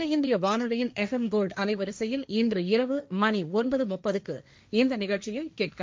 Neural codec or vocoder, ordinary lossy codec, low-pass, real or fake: codec, 16 kHz, 1.1 kbps, Voila-Tokenizer; none; none; fake